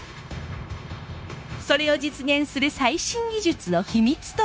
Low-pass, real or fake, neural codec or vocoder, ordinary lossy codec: none; fake; codec, 16 kHz, 0.9 kbps, LongCat-Audio-Codec; none